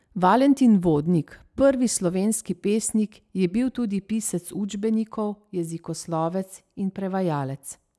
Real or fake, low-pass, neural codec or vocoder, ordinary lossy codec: real; none; none; none